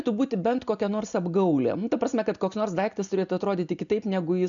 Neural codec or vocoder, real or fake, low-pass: none; real; 7.2 kHz